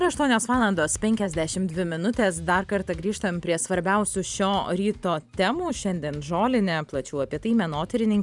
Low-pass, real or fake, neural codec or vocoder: 10.8 kHz; fake; vocoder, 44.1 kHz, 128 mel bands every 512 samples, BigVGAN v2